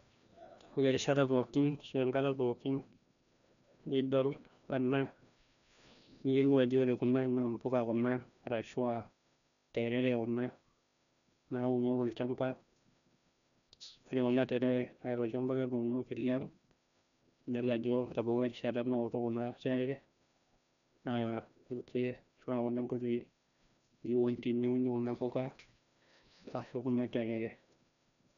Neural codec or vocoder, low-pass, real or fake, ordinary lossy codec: codec, 16 kHz, 1 kbps, FreqCodec, larger model; 7.2 kHz; fake; none